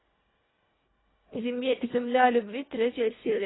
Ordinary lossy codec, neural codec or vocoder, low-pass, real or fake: AAC, 16 kbps; codec, 24 kHz, 1.5 kbps, HILCodec; 7.2 kHz; fake